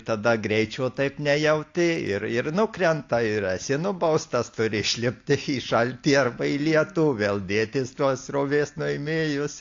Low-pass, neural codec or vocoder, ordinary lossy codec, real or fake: 7.2 kHz; none; AAC, 48 kbps; real